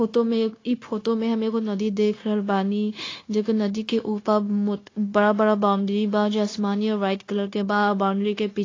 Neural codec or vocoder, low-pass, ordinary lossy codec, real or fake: codec, 16 kHz, 0.9 kbps, LongCat-Audio-Codec; 7.2 kHz; AAC, 32 kbps; fake